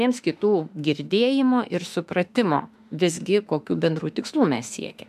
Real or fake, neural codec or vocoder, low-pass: fake; autoencoder, 48 kHz, 32 numbers a frame, DAC-VAE, trained on Japanese speech; 14.4 kHz